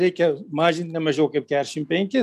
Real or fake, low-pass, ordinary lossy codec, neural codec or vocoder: real; 14.4 kHz; MP3, 96 kbps; none